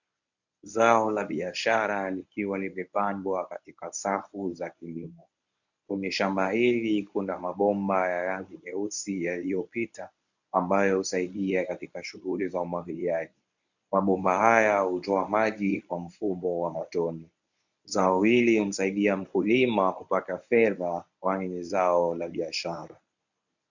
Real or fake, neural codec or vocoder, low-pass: fake; codec, 24 kHz, 0.9 kbps, WavTokenizer, medium speech release version 1; 7.2 kHz